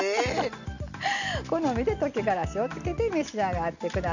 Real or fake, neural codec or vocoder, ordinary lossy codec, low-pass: real; none; none; 7.2 kHz